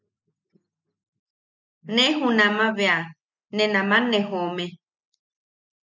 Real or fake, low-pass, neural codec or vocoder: real; 7.2 kHz; none